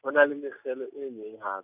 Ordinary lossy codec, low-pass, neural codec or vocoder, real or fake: none; 3.6 kHz; none; real